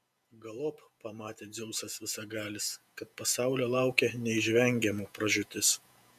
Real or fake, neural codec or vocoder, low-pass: fake; vocoder, 48 kHz, 128 mel bands, Vocos; 14.4 kHz